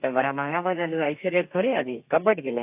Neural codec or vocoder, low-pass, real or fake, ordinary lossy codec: codec, 32 kHz, 1.9 kbps, SNAC; 3.6 kHz; fake; AAC, 32 kbps